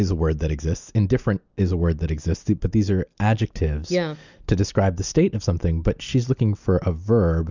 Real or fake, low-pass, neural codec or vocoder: real; 7.2 kHz; none